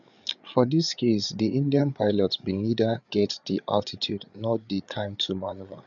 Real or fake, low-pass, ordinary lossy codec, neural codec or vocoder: fake; 7.2 kHz; none; codec, 16 kHz, 8 kbps, FreqCodec, larger model